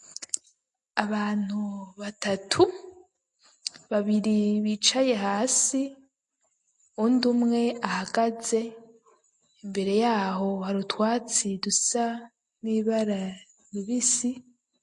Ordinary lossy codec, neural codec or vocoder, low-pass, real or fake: MP3, 48 kbps; none; 10.8 kHz; real